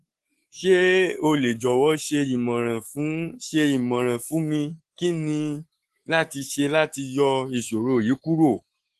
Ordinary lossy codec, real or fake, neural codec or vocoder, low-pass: Opus, 16 kbps; fake; codec, 24 kHz, 3.1 kbps, DualCodec; 10.8 kHz